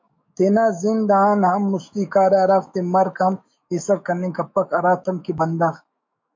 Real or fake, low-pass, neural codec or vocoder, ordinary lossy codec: fake; 7.2 kHz; autoencoder, 48 kHz, 128 numbers a frame, DAC-VAE, trained on Japanese speech; MP3, 48 kbps